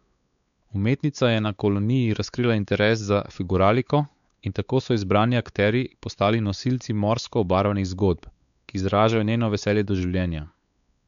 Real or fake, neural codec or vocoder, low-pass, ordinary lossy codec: fake; codec, 16 kHz, 4 kbps, X-Codec, WavLM features, trained on Multilingual LibriSpeech; 7.2 kHz; none